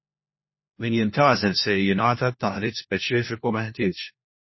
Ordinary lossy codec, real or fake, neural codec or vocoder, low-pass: MP3, 24 kbps; fake; codec, 16 kHz, 1 kbps, FunCodec, trained on LibriTTS, 50 frames a second; 7.2 kHz